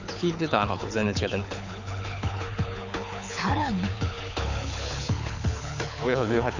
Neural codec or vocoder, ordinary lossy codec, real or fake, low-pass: codec, 24 kHz, 6 kbps, HILCodec; none; fake; 7.2 kHz